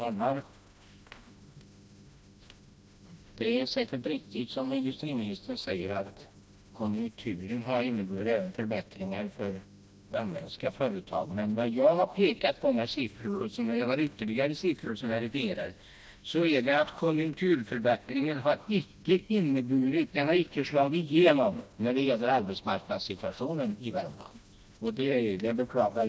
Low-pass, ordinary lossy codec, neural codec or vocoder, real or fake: none; none; codec, 16 kHz, 1 kbps, FreqCodec, smaller model; fake